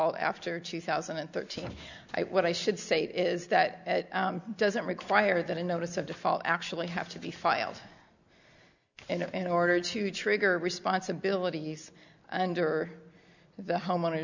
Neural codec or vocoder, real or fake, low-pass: none; real; 7.2 kHz